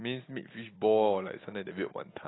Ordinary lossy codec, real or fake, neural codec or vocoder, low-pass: AAC, 16 kbps; real; none; 7.2 kHz